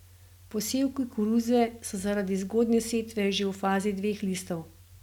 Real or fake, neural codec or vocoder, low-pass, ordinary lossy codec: real; none; 19.8 kHz; none